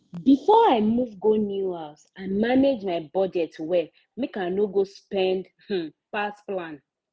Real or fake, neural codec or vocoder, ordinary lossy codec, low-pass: real; none; none; none